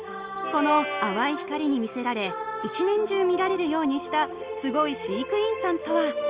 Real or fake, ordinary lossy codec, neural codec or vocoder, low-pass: real; Opus, 32 kbps; none; 3.6 kHz